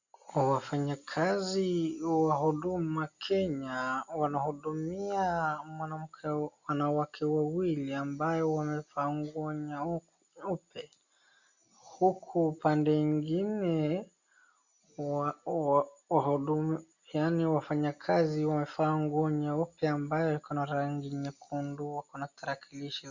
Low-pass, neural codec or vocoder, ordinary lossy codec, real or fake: 7.2 kHz; none; Opus, 64 kbps; real